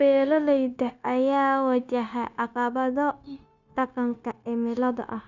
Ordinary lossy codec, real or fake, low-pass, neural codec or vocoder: none; fake; 7.2 kHz; codec, 16 kHz, 0.9 kbps, LongCat-Audio-Codec